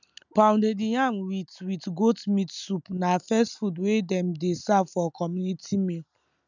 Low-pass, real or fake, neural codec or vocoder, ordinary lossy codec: 7.2 kHz; real; none; none